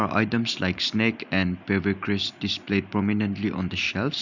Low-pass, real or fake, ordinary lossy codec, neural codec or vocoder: 7.2 kHz; real; none; none